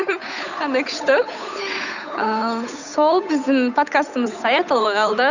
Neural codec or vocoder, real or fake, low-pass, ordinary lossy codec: codec, 16 kHz, 8 kbps, FunCodec, trained on Chinese and English, 25 frames a second; fake; 7.2 kHz; none